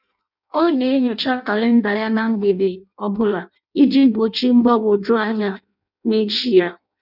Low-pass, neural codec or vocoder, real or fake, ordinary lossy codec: 5.4 kHz; codec, 16 kHz in and 24 kHz out, 0.6 kbps, FireRedTTS-2 codec; fake; none